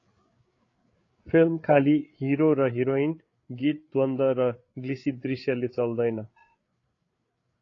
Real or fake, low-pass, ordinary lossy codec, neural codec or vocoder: fake; 7.2 kHz; AAC, 32 kbps; codec, 16 kHz, 8 kbps, FreqCodec, larger model